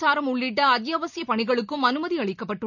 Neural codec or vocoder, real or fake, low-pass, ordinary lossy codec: none; real; 7.2 kHz; none